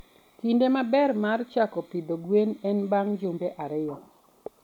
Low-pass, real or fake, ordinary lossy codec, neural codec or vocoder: 19.8 kHz; real; none; none